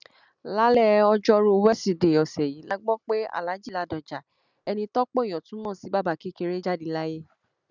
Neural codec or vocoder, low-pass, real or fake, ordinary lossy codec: none; 7.2 kHz; real; none